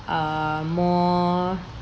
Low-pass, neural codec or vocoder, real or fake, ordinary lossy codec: none; none; real; none